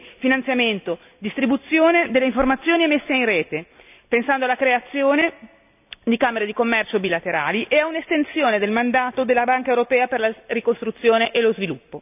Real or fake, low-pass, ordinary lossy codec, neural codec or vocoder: real; 3.6 kHz; none; none